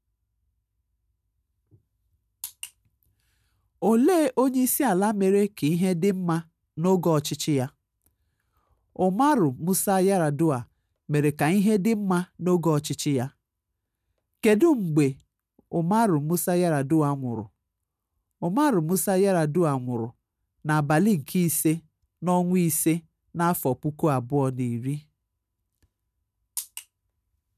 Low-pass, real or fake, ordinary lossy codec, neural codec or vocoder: 14.4 kHz; real; none; none